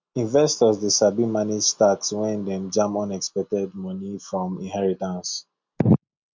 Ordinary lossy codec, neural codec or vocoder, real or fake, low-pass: MP3, 64 kbps; none; real; 7.2 kHz